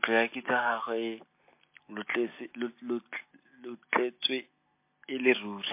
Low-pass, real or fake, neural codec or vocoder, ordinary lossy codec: 3.6 kHz; real; none; MP3, 24 kbps